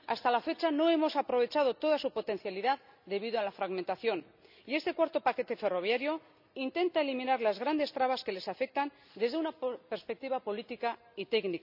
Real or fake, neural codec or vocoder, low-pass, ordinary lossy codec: real; none; 5.4 kHz; none